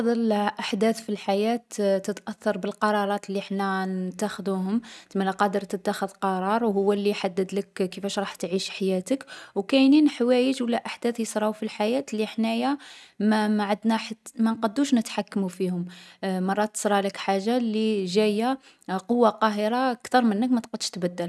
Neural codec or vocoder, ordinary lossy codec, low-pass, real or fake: none; none; none; real